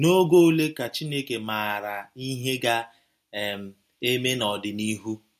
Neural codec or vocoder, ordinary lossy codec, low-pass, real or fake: none; MP3, 64 kbps; 14.4 kHz; real